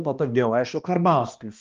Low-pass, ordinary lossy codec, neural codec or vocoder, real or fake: 7.2 kHz; Opus, 24 kbps; codec, 16 kHz, 1 kbps, X-Codec, HuBERT features, trained on balanced general audio; fake